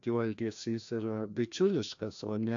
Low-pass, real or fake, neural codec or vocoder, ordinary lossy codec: 7.2 kHz; fake; codec, 16 kHz, 1 kbps, FreqCodec, larger model; AAC, 64 kbps